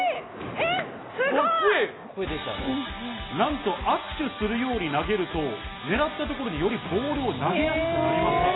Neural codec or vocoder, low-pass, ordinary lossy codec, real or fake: none; 7.2 kHz; AAC, 16 kbps; real